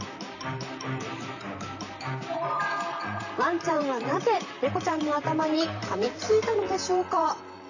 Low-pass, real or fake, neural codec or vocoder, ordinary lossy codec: 7.2 kHz; fake; vocoder, 44.1 kHz, 128 mel bands, Pupu-Vocoder; none